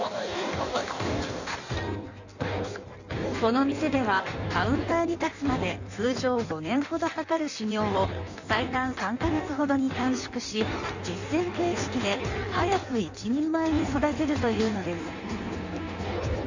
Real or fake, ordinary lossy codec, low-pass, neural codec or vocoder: fake; none; 7.2 kHz; codec, 16 kHz in and 24 kHz out, 1.1 kbps, FireRedTTS-2 codec